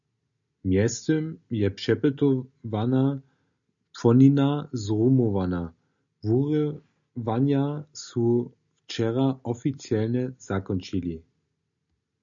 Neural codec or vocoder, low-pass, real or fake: none; 7.2 kHz; real